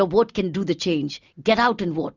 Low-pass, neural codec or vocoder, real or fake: 7.2 kHz; none; real